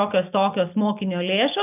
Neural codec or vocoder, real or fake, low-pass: none; real; 3.6 kHz